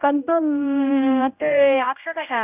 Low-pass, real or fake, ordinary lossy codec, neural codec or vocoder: 3.6 kHz; fake; AAC, 32 kbps; codec, 16 kHz, 0.5 kbps, X-Codec, HuBERT features, trained on general audio